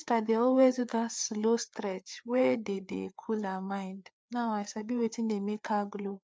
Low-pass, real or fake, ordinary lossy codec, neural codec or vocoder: none; fake; none; codec, 16 kHz, 4 kbps, FreqCodec, larger model